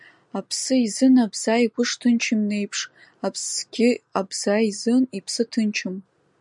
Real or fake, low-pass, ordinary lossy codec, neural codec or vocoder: real; 10.8 kHz; MP3, 96 kbps; none